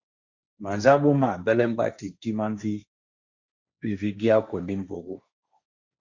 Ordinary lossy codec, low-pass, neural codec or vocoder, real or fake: Opus, 64 kbps; 7.2 kHz; codec, 16 kHz, 1.1 kbps, Voila-Tokenizer; fake